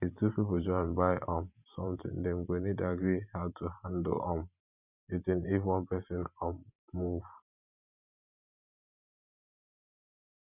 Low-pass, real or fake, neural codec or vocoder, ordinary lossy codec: 3.6 kHz; fake; vocoder, 44.1 kHz, 128 mel bands every 512 samples, BigVGAN v2; none